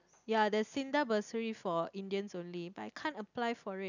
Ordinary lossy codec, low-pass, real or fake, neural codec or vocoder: none; 7.2 kHz; real; none